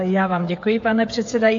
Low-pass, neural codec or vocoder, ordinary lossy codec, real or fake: 7.2 kHz; codec, 16 kHz, 16 kbps, FreqCodec, smaller model; AAC, 48 kbps; fake